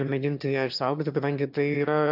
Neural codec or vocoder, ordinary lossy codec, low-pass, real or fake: autoencoder, 22.05 kHz, a latent of 192 numbers a frame, VITS, trained on one speaker; AAC, 48 kbps; 5.4 kHz; fake